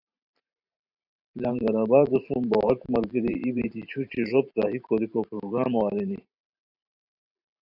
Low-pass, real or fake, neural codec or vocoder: 5.4 kHz; fake; vocoder, 44.1 kHz, 128 mel bands every 512 samples, BigVGAN v2